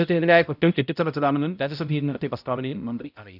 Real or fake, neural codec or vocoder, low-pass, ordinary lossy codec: fake; codec, 16 kHz, 0.5 kbps, X-Codec, HuBERT features, trained on balanced general audio; 5.4 kHz; none